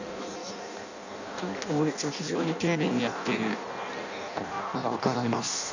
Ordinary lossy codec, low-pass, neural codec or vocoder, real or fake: none; 7.2 kHz; codec, 16 kHz in and 24 kHz out, 0.6 kbps, FireRedTTS-2 codec; fake